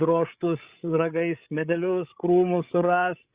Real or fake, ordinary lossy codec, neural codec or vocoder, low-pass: fake; Opus, 64 kbps; codec, 16 kHz, 8 kbps, FreqCodec, larger model; 3.6 kHz